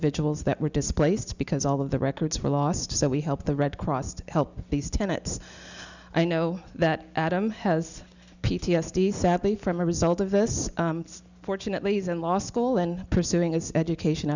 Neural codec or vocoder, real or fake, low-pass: none; real; 7.2 kHz